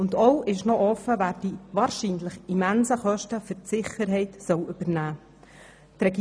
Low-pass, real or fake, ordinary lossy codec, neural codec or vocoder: none; real; none; none